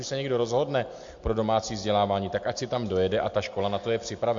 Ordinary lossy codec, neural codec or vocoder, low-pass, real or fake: MP3, 48 kbps; none; 7.2 kHz; real